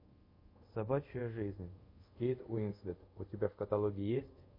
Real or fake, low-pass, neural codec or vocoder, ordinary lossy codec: fake; 5.4 kHz; codec, 24 kHz, 0.5 kbps, DualCodec; MP3, 32 kbps